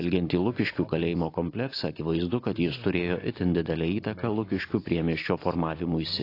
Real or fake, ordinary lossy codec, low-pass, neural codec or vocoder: real; AAC, 32 kbps; 5.4 kHz; none